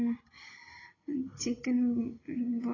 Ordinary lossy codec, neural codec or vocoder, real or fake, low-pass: AAC, 32 kbps; none; real; 7.2 kHz